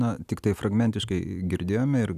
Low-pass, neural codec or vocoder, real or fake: 14.4 kHz; none; real